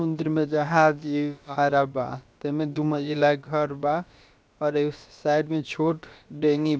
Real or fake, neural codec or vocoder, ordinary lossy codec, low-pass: fake; codec, 16 kHz, about 1 kbps, DyCAST, with the encoder's durations; none; none